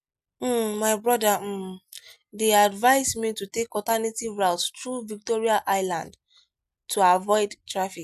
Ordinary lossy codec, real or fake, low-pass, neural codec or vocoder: none; real; 14.4 kHz; none